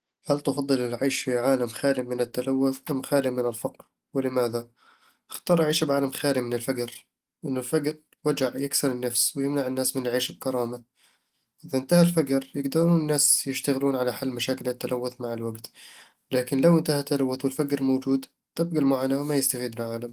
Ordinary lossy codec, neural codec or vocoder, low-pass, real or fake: Opus, 24 kbps; none; 14.4 kHz; real